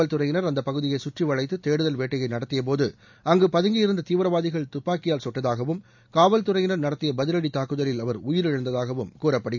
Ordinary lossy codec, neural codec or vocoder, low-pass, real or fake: none; none; 7.2 kHz; real